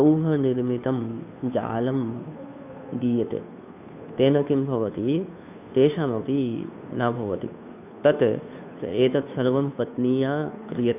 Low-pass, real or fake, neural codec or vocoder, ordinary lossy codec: 3.6 kHz; fake; codec, 16 kHz, 2 kbps, FunCodec, trained on Chinese and English, 25 frames a second; none